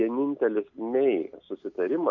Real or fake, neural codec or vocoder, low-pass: real; none; 7.2 kHz